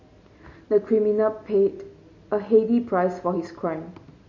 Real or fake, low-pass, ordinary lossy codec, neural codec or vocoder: real; 7.2 kHz; MP3, 32 kbps; none